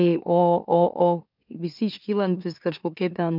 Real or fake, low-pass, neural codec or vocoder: fake; 5.4 kHz; autoencoder, 44.1 kHz, a latent of 192 numbers a frame, MeloTTS